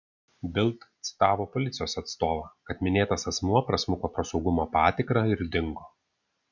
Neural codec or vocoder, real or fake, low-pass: none; real; 7.2 kHz